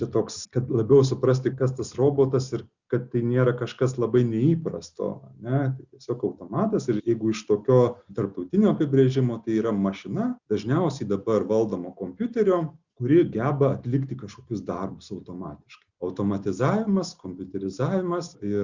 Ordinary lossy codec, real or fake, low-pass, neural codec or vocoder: Opus, 64 kbps; real; 7.2 kHz; none